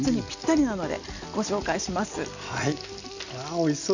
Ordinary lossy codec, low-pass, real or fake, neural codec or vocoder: none; 7.2 kHz; real; none